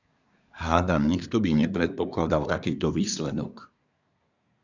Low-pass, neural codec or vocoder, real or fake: 7.2 kHz; codec, 24 kHz, 1 kbps, SNAC; fake